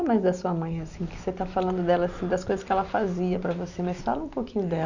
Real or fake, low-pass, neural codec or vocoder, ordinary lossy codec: real; 7.2 kHz; none; none